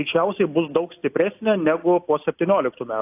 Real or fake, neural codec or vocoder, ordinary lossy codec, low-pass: real; none; AAC, 32 kbps; 3.6 kHz